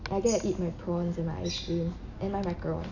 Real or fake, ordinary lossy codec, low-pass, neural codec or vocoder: real; none; 7.2 kHz; none